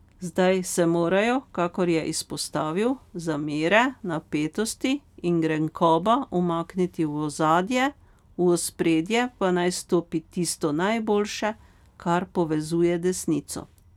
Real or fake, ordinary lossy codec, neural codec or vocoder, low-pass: real; none; none; 19.8 kHz